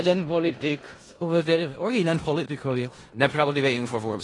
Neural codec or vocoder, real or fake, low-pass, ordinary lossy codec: codec, 16 kHz in and 24 kHz out, 0.4 kbps, LongCat-Audio-Codec, four codebook decoder; fake; 10.8 kHz; AAC, 32 kbps